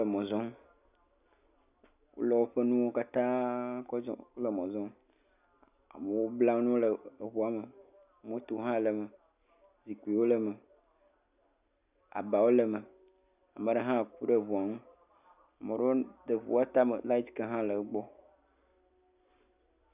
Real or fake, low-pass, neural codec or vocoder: real; 3.6 kHz; none